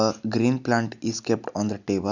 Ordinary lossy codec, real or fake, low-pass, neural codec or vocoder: none; real; 7.2 kHz; none